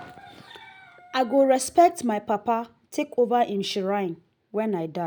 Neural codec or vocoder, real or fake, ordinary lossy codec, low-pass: none; real; none; none